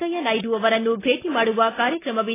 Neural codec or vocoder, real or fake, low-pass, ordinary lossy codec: none; real; 3.6 kHz; AAC, 16 kbps